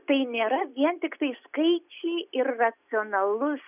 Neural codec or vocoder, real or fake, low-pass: none; real; 3.6 kHz